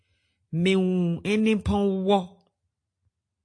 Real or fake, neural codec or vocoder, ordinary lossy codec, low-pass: real; none; AAC, 48 kbps; 9.9 kHz